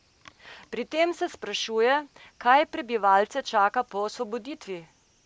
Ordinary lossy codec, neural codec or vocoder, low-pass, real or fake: none; none; none; real